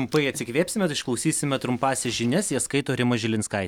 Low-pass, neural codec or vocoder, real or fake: 19.8 kHz; none; real